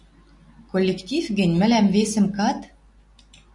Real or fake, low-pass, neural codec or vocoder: real; 10.8 kHz; none